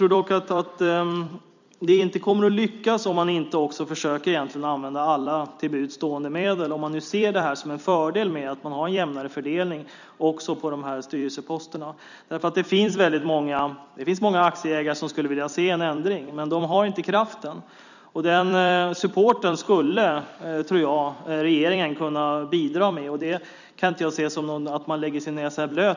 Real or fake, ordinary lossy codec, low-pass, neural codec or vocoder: fake; none; 7.2 kHz; vocoder, 44.1 kHz, 128 mel bands every 256 samples, BigVGAN v2